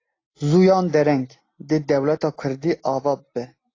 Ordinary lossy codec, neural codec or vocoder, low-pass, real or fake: AAC, 32 kbps; none; 7.2 kHz; real